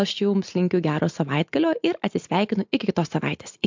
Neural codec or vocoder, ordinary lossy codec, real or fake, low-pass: none; MP3, 64 kbps; real; 7.2 kHz